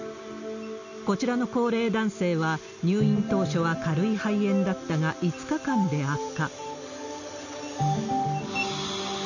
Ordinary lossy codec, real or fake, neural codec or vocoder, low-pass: none; real; none; 7.2 kHz